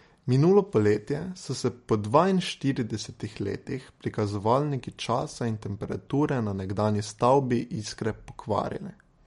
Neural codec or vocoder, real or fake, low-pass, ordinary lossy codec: none; real; 19.8 kHz; MP3, 48 kbps